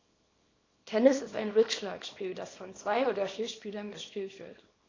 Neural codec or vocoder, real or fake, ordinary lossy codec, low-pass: codec, 24 kHz, 0.9 kbps, WavTokenizer, small release; fake; AAC, 32 kbps; 7.2 kHz